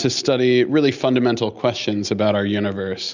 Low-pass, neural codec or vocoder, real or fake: 7.2 kHz; none; real